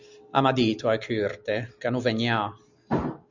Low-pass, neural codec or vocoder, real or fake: 7.2 kHz; none; real